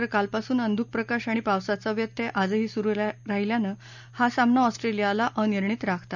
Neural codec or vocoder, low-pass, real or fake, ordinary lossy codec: none; 7.2 kHz; real; none